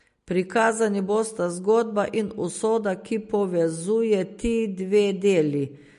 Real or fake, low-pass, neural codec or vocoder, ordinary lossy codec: real; 14.4 kHz; none; MP3, 48 kbps